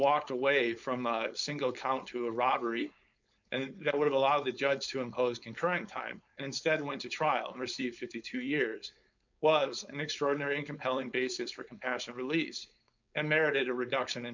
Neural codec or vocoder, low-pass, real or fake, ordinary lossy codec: codec, 16 kHz, 4.8 kbps, FACodec; 7.2 kHz; fake; MP3, 64 kbps